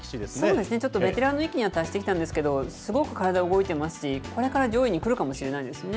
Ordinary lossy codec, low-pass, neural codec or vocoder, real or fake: none; none; none; real